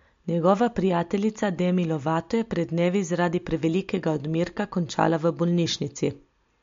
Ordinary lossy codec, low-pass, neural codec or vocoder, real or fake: MP3, 48 kbps; 7.2 kHz; none; real